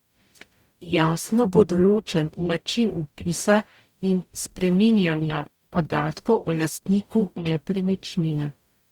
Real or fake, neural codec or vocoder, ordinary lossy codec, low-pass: fake; codec, 44.1 kHz, 0.9 kbps, DAC; Opus, 64 kbps; 19.8 kHz